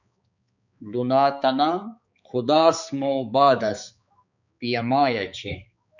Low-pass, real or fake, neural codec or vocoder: 7.2 kHz; fake; codec, 16 kHz, 4 kbps, X-Codec, HuBERT features, trained on balanced general audio